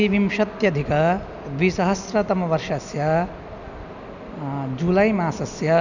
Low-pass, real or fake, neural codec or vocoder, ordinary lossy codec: 7.2 kHz; real; none; none